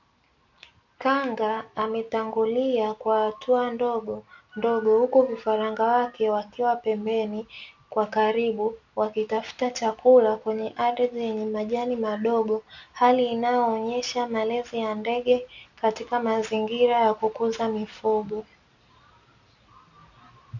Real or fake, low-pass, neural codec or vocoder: real; 7.2 kHz; none